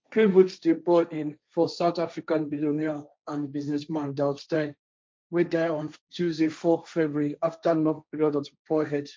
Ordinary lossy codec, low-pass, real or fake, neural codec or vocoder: none; none; fake; codec, 16 kHz, 1.1 kbps, Voila-Tokenizer